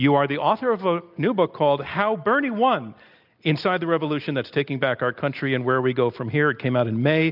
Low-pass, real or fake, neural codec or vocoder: 5.4 kHz; real; none